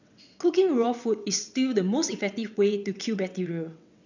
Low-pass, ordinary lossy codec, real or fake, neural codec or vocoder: 7.2 kHz; none; fake; vocoder, 22.05 kHz, 80 mel bands, WaveNeXt